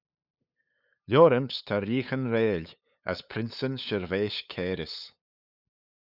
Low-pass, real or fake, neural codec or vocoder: 5.4 kHz; fake; codec, 16 kHz, 8 kbps, FunCodec, trained on LibriTTS, 25 frames a second